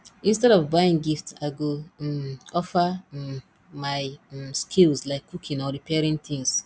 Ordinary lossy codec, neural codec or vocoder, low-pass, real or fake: none; none; none; real